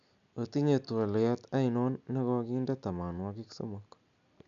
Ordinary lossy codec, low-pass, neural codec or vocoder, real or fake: none; 7.2 kHz; none; real